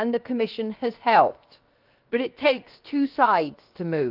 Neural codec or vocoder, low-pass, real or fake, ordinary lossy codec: codec, 16 kHz, 0.7 kbps, FocalCodec; 5.4 kHz; fake; Opus, 24 kbps